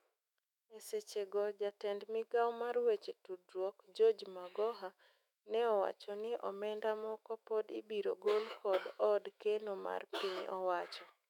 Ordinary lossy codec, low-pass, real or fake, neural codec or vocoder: none; 19.8 kHz; fake; autoencoder, 48 kHz, 128 numbers a frame, DAC-VAE, trained on Japanese speech